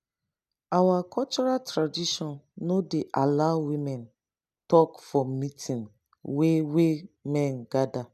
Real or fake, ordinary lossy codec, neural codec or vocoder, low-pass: real; none; none; 14.4 kHz